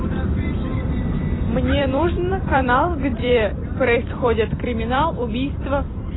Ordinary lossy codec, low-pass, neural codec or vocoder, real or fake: AAC, 16 kbps; 7.2 kHz; vocoder, 44.1 kHz, 128 mel bands every 256 samples, BigVGAN v2; fake